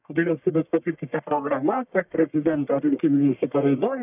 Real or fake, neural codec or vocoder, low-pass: fake; codec, 44.1 kHz, 1.7 kbps, Pupu-Codec; 3.6 kHz